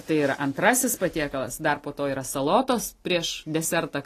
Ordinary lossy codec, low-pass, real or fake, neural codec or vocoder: AAC, 48 kbps; 14.4 kHz; real; none